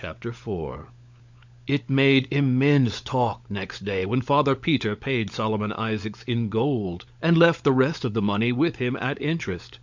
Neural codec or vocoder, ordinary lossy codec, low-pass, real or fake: codec, 16 kHz, 16 kbps, FunCodec, trained on LibriTTS, 50 frames a second; MP3, 64 kbps; 7.2 kHz; fake